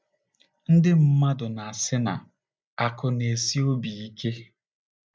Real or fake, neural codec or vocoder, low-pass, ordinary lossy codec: real; none; none; none